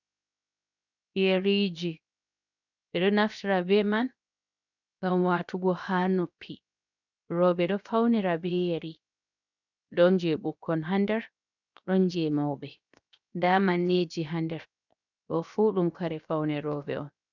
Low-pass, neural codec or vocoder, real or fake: 7.2 kHz; codec, 16 kHz, 0.7 kbps, FocalCodec; fake